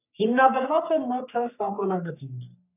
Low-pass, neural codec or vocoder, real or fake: 3.6 kHz; codec, 44.1 kHz, 3.4 kbps, Pupu-Codec; fake